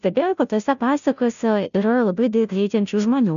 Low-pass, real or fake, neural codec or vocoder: 7.2 kHz; fake; codec, 16 kHz, 0.5 kbps, FunCodec, trained on Chinese and English, 25 frames a second